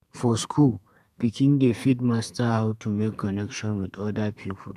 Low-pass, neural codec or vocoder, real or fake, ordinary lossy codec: 14.4 kHz; codec, 32 kHz, 1.9 kbps, SNAC; fake; none